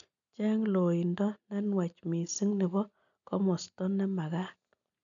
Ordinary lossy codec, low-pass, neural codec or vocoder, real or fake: none; 7.2 kHz; none; real